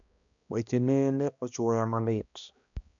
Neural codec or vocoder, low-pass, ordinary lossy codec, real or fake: codec, 16 kHz, 1 kbps, X-Codec, HuBERT features, trained on balanced general audio; 7.2 kHz; none; fake